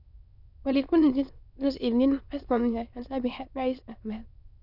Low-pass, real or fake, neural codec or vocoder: 5.4 kHz; fake; autoencoder, 22.05 kHz, a latent of 192 numbers a frame, VITS, trained on many speakers